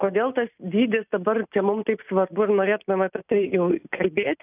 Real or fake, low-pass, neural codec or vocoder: real; 3.6 kHz; none